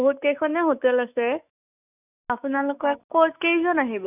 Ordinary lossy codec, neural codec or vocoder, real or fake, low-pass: none; autoencoder, 48 kHz, 128 numbers a frame, DAC-VAE, trained on Japanese speech; fake; 3.6 kHz